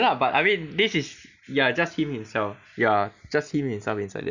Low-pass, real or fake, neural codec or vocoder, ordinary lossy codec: 7.2 kHz; real; none; none